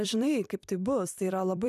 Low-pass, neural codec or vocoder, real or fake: 14.4 kHz; vocoder, 44.1 kHz, 128 mel bands every 256 samples, BigVGAN v2; fake